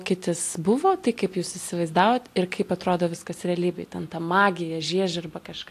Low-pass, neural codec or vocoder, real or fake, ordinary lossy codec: 14.4 kHz; none; real; AAC, 64 kbps